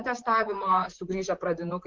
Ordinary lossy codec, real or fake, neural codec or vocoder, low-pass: Opus, 16 kbps; fake; vocoder, 44.1 kHz, 128 mel bands, Pupu-Vocoder; 7.2 kHz